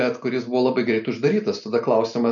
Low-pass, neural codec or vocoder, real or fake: 7.2 kHz; none; real